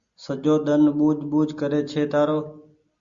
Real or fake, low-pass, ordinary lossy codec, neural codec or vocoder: real; 7.2 kHz; Opus, 64 kbps; none